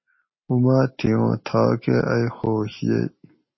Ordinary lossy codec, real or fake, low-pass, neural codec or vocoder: MP3, 24 kbps; real; 7.2 kHz; none